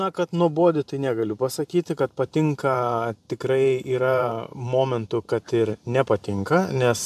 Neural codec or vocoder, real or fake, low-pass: vocoder, 44.1 kHz, 128 mel bands every 512 samples, BigVGAN v2; fake; 14.4 kHz